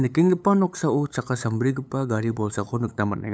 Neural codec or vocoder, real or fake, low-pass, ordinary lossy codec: codec, 16 kHz, 8 kbps, FunCodec, trained on LibriTTS, 25 frames a second; fake; none; none